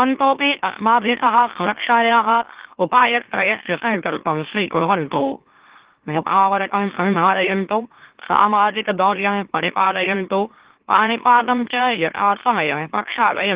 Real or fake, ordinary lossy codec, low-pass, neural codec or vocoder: fake; Opus, 16 kbps; 3.6 kHz; autoencoder, 44.1 kHz, a latent of 192 numbers a frame, MeloTTS